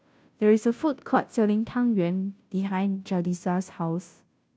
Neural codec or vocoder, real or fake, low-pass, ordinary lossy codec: codec, 16 kHz, 0.5 kbps, FunCodec, trained on Chinese and English, 25 frames a second; fake; none; none